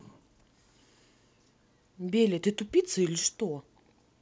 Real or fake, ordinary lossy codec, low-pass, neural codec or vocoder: real; none; none; none